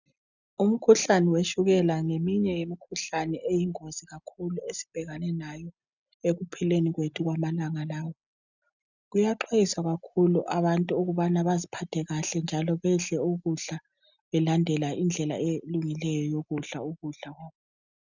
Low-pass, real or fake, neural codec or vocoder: 7.2 kHz; real; none